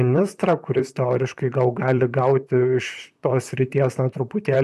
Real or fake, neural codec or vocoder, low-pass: fake; vocoder, 44.1 kHz, 128 mel bands, Pupu-Vocoder; 14.4 kHz